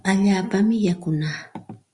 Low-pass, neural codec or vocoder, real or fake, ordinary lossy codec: 10.8 kHz; none; real; Opus, 64 kbps